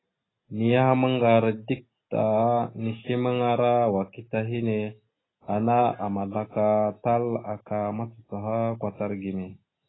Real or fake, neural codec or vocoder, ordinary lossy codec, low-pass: real; none; AAC, 16 kbps; 7.2 kHz